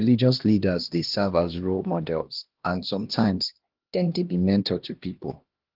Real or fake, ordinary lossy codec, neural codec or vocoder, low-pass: fake; Opus, 16 kbps; codec, 16 kHz, 1 kbps, X-Codec, HuBERT features, trained on LibriSpeech; 5.4 kHz